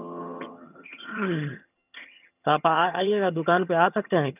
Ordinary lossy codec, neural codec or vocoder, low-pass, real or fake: none; vocoder, 22.05 kHz, 80 mel bands, HiFi-GAN; 3.6 kHz; fake